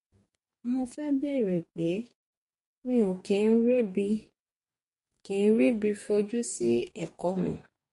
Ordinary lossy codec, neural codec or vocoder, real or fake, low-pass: MP3, 48 kbps; codec, 44.1 kHz, 2.6 kbps, DAC; fake; 14.4 kHz